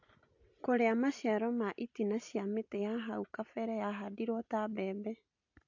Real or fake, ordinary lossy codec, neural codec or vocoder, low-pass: real; AAC, 48 kbps; none; 7.2 kHz